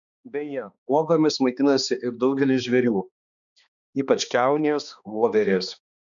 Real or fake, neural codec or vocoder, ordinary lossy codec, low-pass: fake; codec, 16 kHz, 2 kbps, X-Codec, HuBERT features, trained on balanced general audio; MP3, 96 kbps; 7.2 kHz